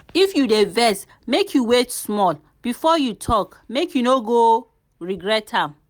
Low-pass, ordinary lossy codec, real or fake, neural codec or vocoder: none; none; real; none